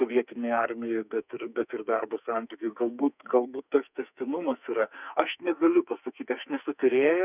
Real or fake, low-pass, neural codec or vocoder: fake; 3.6 kHz; codec, 44.1 kHz, 2.6 kbps, SNAC